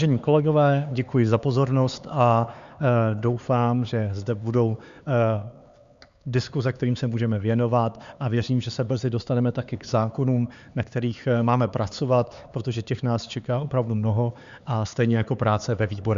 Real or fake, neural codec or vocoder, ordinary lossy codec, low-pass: fake; codec, 16 kHz, 4 kbps, X-Codec, HuBERT features, trained on LibriSpeech; Opus, 64 kbps; 7.2 kHz